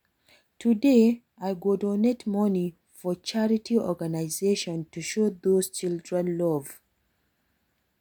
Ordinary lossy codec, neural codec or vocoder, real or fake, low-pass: none; none; real; none